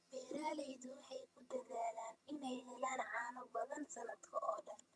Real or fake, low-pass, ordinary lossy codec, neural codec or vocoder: fake; none; none; vocoder, 22.05 kHz, 80 mel bands, HiFi-GAN